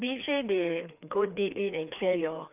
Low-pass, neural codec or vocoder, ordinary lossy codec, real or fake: 3.6 kHz; codec, 16 kHz, 2 kbps, FreqCodec, larger model; none; fake